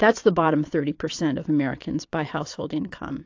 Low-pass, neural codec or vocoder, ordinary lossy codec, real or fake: 7.2 kHz; vocoder, 22.05 kHz, 80 mel bands, Vocos; AAC, 48 kbps; fake